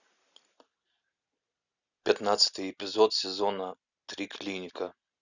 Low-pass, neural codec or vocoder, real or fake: 7.2 kHz; none; real